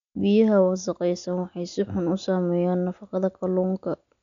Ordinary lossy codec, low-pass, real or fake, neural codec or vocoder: none; 7.2 kHz; real; none